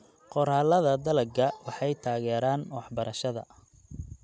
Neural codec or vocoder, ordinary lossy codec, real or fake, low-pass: none; none; real; none